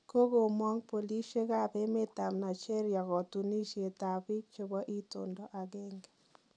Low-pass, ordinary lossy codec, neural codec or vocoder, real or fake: 9.9 kHz; none; none; real